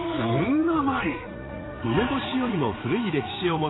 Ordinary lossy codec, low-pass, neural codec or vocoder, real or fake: AAC, 16 kbps; 7.2 kHz; vocoder, 44.1 kHz, 80 mel bands, Vocos; fake